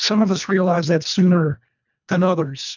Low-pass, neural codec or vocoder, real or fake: 7.2 kHz; codec, 24 kHz, 1.5 kbps, HILCodec; fake